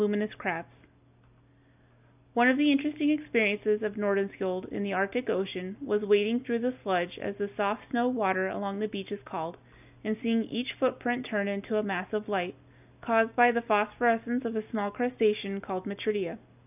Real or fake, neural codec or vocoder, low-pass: real; none; 3.6 kHz